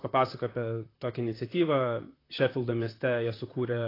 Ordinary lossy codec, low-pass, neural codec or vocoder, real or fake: AAC, 24 kbps; 5.4 kHz; vocoder, 44.1 kHz, 128 mel bands, Pupu-Vocoder; fake